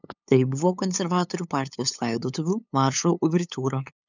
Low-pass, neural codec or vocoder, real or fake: 7.2 kHz; codec, 16 kHz, 8 kbps, FunCodec, trained on LibriTTS, 25 frames a second; fake